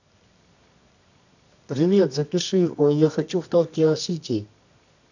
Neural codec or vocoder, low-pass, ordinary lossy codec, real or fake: codec, 24 kHz, 0.9 kbps, WavTokenizer, medium music audio release; 7.2 kHz; none; fake